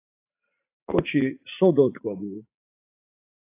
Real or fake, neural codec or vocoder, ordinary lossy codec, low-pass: fake; vocoder, 44.1 kHz, 80 mel bands, Vocos; AAC, 24 kbps; 3.6 kHz